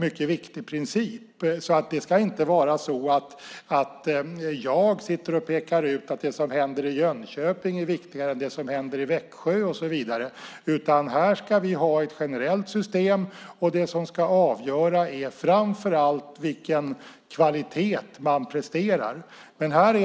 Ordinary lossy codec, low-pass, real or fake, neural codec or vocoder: none; none; real; none